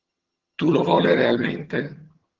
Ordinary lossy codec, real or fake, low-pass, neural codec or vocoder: Opus, 16 kbps; fake; 7.2 kHz; vocoder, 22.05 kHz, 80 mel bands, HiFi-GAN